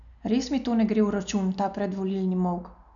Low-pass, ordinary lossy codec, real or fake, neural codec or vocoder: 7.2 kHz; none; real; none